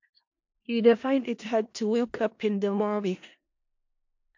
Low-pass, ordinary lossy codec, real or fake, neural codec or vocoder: 7.2 kHz; MP3, 48 kbps; fake; codec, 16 kHz in and 24 kHz out, 0.4 kbps, LongCat-Audio-Codec, four codebook decoder